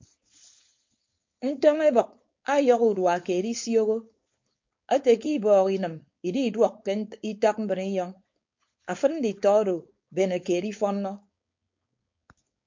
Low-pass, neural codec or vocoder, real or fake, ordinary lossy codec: 7.2 kHz; codec, 16 kHz, 4.8 kbps, FACodec; fake; MP3, 48 kbps